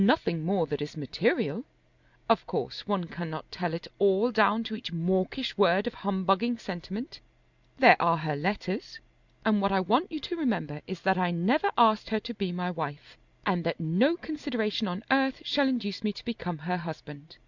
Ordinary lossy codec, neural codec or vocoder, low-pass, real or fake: Opus, 64 kbps; none; 7.2 kHz; real